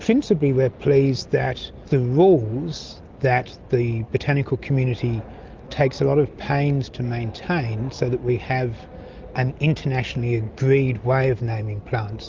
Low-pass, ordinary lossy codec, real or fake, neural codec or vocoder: 7.2 kHz; Opus, 24 kbps; real; none